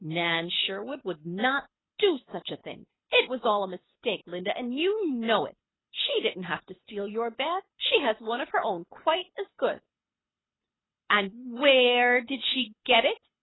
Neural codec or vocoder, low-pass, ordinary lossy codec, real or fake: codec, 24 kHz, 6 kbps, HILCodec; 7.2 kHz; AAC, 16 kbps; fake